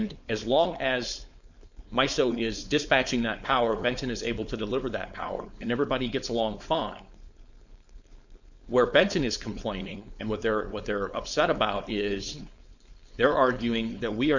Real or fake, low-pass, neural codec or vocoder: fake; 7.2 kHz; codec, 16 kHz, 4.8 kbps, FACodec